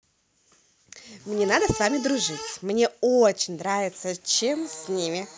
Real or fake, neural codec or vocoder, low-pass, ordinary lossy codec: real; none; none; none